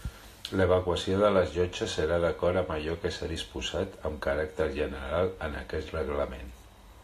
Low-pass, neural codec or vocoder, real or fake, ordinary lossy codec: 14.4 kHz; none; real; AAC, 48 kbps